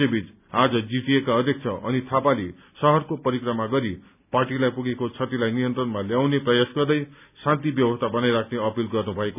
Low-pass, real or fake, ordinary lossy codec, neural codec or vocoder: 3.6 kHz; real; none; none